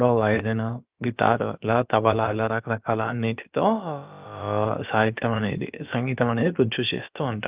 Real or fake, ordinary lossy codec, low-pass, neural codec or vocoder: fake; Opus, 24 kbps; 3.6 kHz; codec, 16 kHz, about 1 kbps, DyCAST, with the encoder's durations